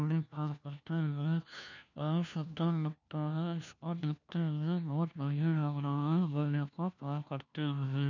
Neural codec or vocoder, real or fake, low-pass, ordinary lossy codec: codec, 16 kHz, 1 kbps, FunCodec, trained on LibriTTS, 50 frames a second; fake; 7.2 kHz; none